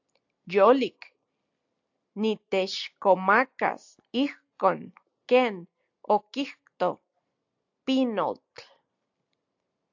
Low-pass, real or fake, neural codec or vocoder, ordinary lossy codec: 7.2 kHz; real; none; AAC, 48 kbps